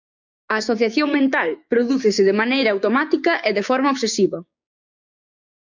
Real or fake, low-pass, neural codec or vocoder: fake; 7.2 kHz; codec, 16 kHz, 6 kbps, DAC